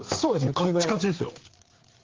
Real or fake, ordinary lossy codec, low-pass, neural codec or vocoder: fake; Opus, 16 kbps; 7.2 kHz; codec, 16 kHz, 4 kbps, FreqCodec, larger model